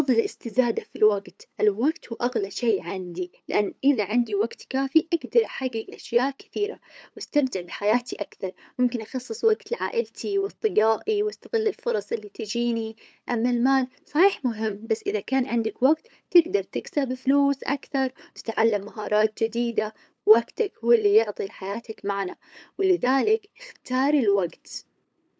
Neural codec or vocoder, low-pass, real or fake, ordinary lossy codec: codec, 16 kHz, 8 kbps, FunCodec, trained on LibriTTS, 25 frames a second; none; fake; none